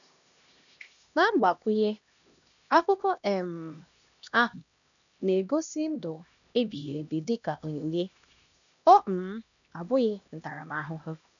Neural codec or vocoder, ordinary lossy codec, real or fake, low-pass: codec, 16 kHz, 1 kbps, X-Codec, HuBERT features, trained on LibriSpeech; none; fake; 7.2 kHz